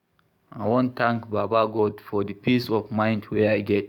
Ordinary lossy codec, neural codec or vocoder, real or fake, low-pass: none; codec, 44.1 kHz, 7.8 kbps, DAC; fake; 19.8 kHz